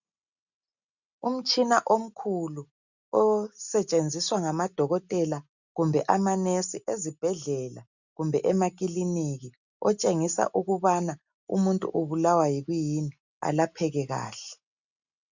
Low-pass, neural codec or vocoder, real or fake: 7.2 kHz; none; real